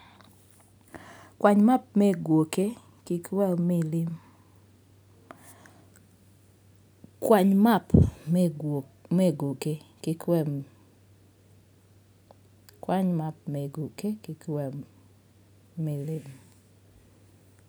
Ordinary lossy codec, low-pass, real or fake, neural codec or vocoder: none; none; real; none